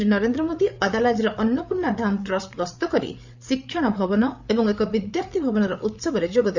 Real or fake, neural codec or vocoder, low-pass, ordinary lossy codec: fake; codec, 16 kHz, 8 kbps, FreqCodec, larger model; 7.2 kHz; none